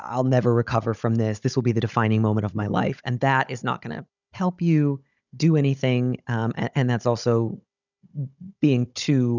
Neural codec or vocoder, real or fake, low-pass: codec, 16 kHz, 16 kbps, FunCodec, trained on Chinese and English, 50 frames a second; fake; 7.2 kHz